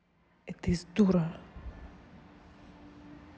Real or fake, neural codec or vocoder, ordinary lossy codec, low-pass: real; none; none; none